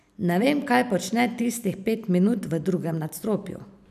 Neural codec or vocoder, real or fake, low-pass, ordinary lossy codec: vocoder, 44.1 kHz, 128 mel bands every 512 samples, BigVGAN v2; fake; 14.4 kHz; none